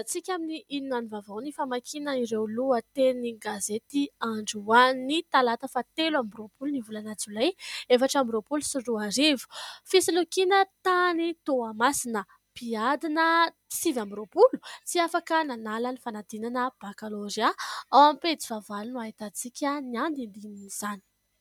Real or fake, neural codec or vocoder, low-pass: real; none; 19.8 kHz